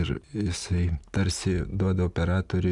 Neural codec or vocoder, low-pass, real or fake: none; 10.8 kHz; real